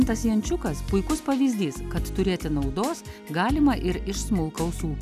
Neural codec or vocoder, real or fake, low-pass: none; real; 14.4 kHz